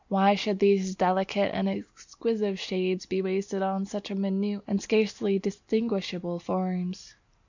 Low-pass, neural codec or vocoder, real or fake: 7.2 kHz; none; real